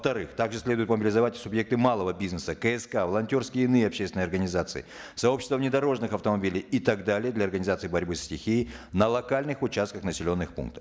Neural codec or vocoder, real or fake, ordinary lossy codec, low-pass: none; real; none; none